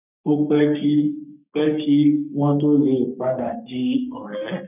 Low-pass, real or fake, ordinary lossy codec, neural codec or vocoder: 3.6 kHz; fake; none; codec, 44.1 kHz, 3.4 kbps, Pupu-Codec